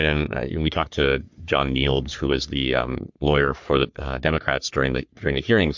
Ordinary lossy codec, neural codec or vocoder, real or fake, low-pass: MP3, 64 kbps; codec, 44.1 kHz, 3.4 kbps, Pupu-Codec; fake; 7.2 kHz